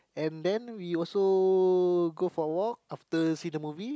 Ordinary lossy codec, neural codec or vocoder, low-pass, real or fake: none; none; none; real